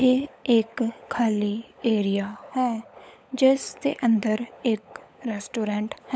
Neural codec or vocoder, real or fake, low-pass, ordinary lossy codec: codec, 16 kHz, 16 kbps, FunCodec, trained on LibriTTS, 50 frames a second; fake; none; none